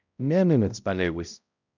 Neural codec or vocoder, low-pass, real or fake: codec, 16 kHz, 0.5 kbps, X-Codec, HuBERT features, trained on balanced general audio; 7.2 kHz; fake